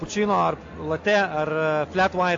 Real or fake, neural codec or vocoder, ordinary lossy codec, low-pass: real; none; AAC, 48 kbps; 7.2 kHz